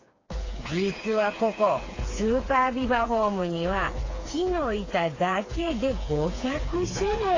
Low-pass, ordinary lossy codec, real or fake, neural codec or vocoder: 7.2 kHz; AAC, 32 kbps; fake; codec, 16 kHz, 4 kbps, FreqCodec, smaller model